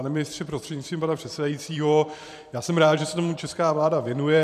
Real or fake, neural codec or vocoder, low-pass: fake; vocoder, 44.1 kHz, 128 mel bands every 512 samples, BigVGAN v2; 14.4 kHz